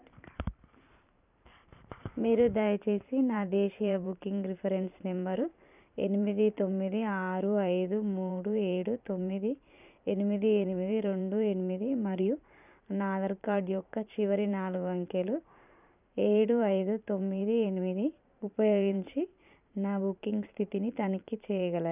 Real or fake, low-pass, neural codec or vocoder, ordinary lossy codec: fake; 3.6 kHz; vocoder, 44.1 kHz, 128 mel bands every 512 samples, BigVGAN v2; none